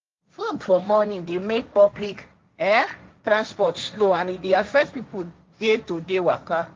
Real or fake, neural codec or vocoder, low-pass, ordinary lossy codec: fake; codec, 16 kHz, 1.1 kbps, Voila-Tokenizer; 7.2 kHz; Opus, 32 kbps